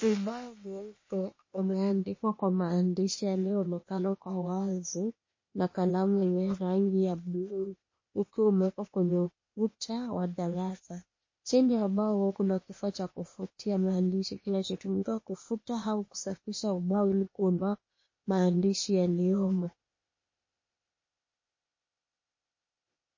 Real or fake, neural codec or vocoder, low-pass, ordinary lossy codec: fake; codec, 16 kHz, 0.8 kbps, ZipCodec; 7.2 kHz; MP3, 32 kbps